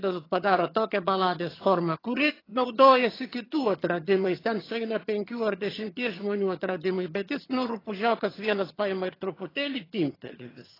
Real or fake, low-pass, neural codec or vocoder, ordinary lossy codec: fake; 5.4 kHz; vocoder, 22.05 kHz, 80 mel bands, HiFi-GAN; AAC, 24 kbps